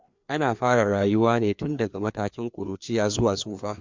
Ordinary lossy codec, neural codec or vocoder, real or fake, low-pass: MP3, 64 kbps; codec, 16 kHz, 2 kbps, FreqCodec, larger model; fake; 7.2 kHz